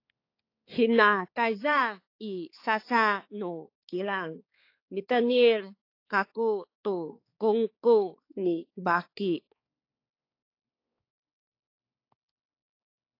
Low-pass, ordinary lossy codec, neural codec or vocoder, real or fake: 5.4 kHz; AAC, 32 kbps; codec, 16 kHz, 4 kbps, X-Codec, HuBERT features, trained on balanced general audio; fake